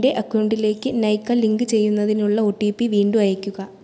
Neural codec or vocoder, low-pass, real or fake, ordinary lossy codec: none; none; real; none